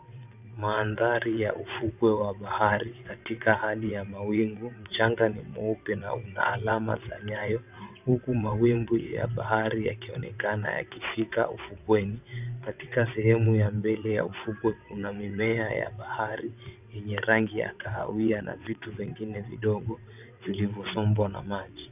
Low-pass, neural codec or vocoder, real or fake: 3.6 kHz; vocoder, 22.05 kHz, 80 mel bands, WaveNeXt; fake